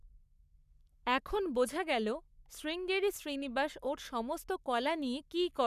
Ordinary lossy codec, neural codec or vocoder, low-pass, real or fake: none; codec, 44.1 kHz, 7.8 kbps, Pupu-Codec; 14.4 kHz; fake